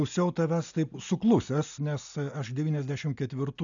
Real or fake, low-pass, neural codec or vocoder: real; 7.2 kHz; none